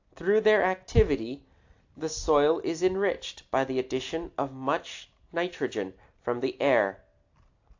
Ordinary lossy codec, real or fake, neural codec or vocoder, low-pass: AAC, 48 kbps; real; none; 7.2 kHz